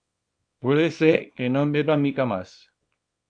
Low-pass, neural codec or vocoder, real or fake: 9.9 kHz; codec, 24 kHz, 0.9 kbps, WavTokenizer, small release; fake